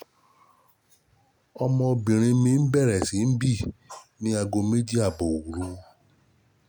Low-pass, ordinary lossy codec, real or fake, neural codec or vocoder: none; none; real; none